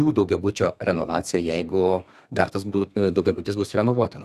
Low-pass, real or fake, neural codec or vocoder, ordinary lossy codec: 14.4 kHz; fake; codec, 32 kHz, 1.9 kbps, SNAC; Opus, 64 kbps